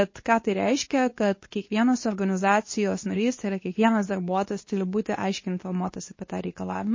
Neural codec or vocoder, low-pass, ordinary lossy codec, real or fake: codec, 24 kHz, 0.9 kbps, WavTokenizer, medium speech release version 2; 7.2 kHz; MP3, 32 kbps; fake